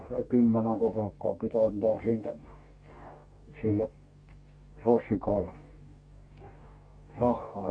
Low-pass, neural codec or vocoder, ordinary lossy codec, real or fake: 9.9 kHz; codec, 44.1 kHz, 2.6 kbps, DAC; Opus, 64 kbps; fake